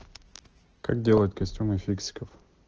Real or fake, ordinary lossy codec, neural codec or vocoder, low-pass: real; Opus, 16 kbps; none; 7.2 kHz